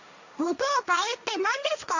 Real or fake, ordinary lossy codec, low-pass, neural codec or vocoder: fake; none; 7.2 kHz; codec, 16 kHz, 1.1 kbps, Voila-Tokenizer